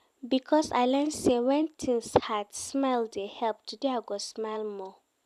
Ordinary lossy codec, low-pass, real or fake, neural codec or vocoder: none; 14.4 kHz; fake; vocoder, 44.1 kHz, 128 mel bands every 256 samples, BigVGAN v2